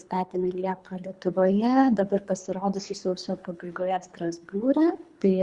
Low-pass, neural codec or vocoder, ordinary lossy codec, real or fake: 10.8 kHz; codec, 24 kHz, 3 kbps, HILCodec; Opus, 64 kbps; fake